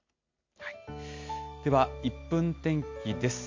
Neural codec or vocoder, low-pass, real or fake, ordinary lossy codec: none; 7.2 kHz; real; MP3, 48 kbps